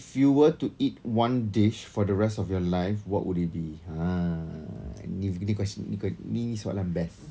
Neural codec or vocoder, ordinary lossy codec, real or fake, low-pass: none; none; real; none